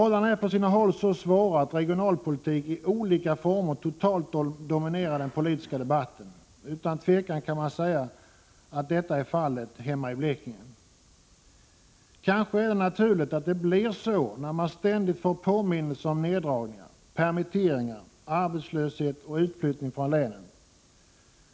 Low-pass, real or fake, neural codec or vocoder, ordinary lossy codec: none; real; none; none